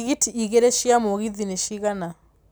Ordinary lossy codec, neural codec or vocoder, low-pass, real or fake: none; none; none; real